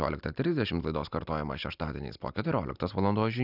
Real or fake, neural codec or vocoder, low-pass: real; none; 5.4 kHz